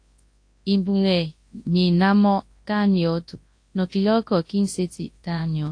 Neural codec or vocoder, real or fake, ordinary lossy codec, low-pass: codec, 24 kHz, 0.9 kbps, WavTokenizer, large speech release; fake; AAC, 48 kbps; 9.9 kHz